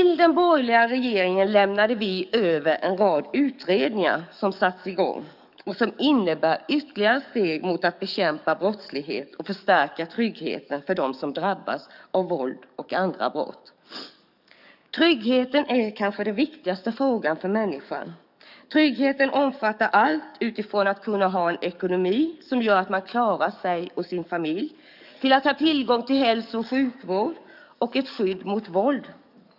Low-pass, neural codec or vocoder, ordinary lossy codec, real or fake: 5.4 kHz; codec, 44.1 kHz, 7.8 kbps, DAC; none; fake